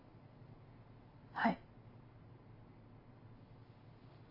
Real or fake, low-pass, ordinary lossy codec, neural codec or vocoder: real; 5.4 kHz; AAC, 32 kbps; none